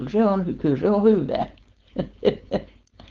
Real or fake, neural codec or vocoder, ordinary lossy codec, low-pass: fake; codec, 16 kHz, 4.8 kbps, FACodec; Opus, 32 kbps; 7.2 kHz